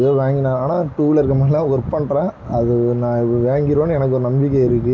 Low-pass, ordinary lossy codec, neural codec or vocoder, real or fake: none; none; none; real